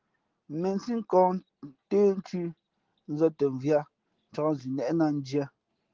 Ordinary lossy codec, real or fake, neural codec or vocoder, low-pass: Opus, 16 kbps; real; none; 7.2 kHz